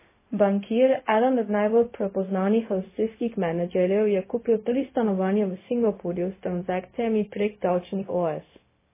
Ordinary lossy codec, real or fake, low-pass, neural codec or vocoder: MP3, 16 kbps; fake; 3.6 kHz; codec, 16 kHz, 0.4 kbps, LongCat-Audio-Codec